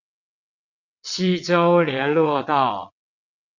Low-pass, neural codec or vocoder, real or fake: 7.2 kHz; vocoder, 22.05 kHz, 80 mel bands, WaveNeXt; fake